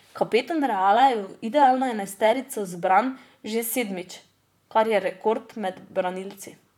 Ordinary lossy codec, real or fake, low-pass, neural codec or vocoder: none; fake; 19.8 kHz; vocoder, 44.1 kHz, 128 mel bands, Pupu-Vocoder